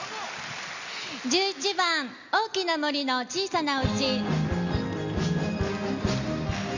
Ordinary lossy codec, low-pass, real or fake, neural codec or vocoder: Opus, 64 kbps; 7.2 kHz; real; none